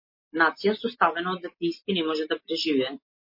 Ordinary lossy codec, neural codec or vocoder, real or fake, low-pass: MP3, 32 kbps; none; real; 5.4 kHz